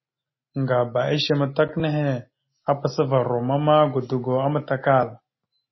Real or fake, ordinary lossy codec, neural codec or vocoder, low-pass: real; MP3, 24 kbps; none; 7.2 kHz